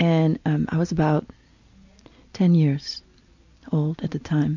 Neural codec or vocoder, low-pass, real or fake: none; 7.2 kHz; real